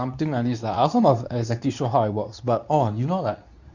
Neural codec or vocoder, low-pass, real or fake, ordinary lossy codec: codec, 24 kHz, 0.9 kbps, WavTokenizer, medium speech release version 2; 7.2 kHz; fake; none